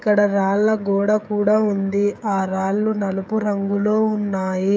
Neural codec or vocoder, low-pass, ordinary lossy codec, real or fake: codec, 16 kHz, 16 kbps, FreqCodec, smaller model; none; none; fake